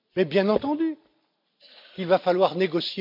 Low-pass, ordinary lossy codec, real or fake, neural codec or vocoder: 5.4 kHz; MP3, 48 kbps; real; none